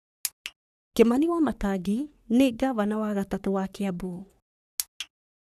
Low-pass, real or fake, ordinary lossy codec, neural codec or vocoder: 14.4 kHz; fake; none; codec, 44.1 kHz, 3.4 kbps, Pupu-Codec